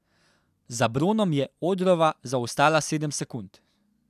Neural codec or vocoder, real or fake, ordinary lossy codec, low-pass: none; real; none; 14.4 kHz